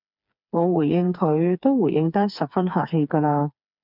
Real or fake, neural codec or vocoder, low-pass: fake; codec, 16 kHz, 4 kbps, FreqCodec, smaller model; 5.4 kHz